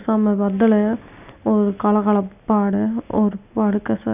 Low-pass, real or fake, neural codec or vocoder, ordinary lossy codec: 3.6 kHz; real; none; none